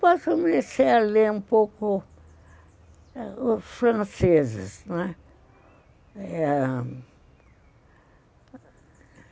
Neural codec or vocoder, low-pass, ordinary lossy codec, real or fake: none; none; none; real